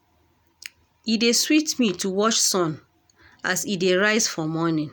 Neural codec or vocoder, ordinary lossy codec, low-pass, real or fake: none; none; none; real